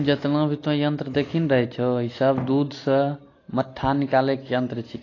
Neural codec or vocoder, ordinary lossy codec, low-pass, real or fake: none; AAC, 32 kbps; 7.2 kHz; real